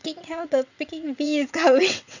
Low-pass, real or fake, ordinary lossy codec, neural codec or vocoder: 7.2 kHz; fake; none; vocoder, 22.05 kHz, 80 mel bands, WaveNeXt